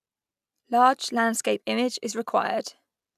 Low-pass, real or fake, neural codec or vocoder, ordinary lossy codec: 14.4 kHz; real; none; none